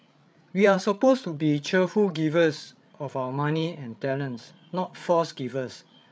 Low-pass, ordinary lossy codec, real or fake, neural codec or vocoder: none; none; fake; codec, 16 kHz, 8 kbps, FreqCodec, larger model